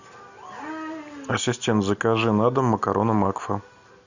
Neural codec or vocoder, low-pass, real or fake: none; 7.2 kHz; real